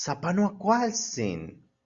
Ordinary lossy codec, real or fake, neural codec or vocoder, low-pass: Opus, 64 kbps; real; none; 7.2 kHz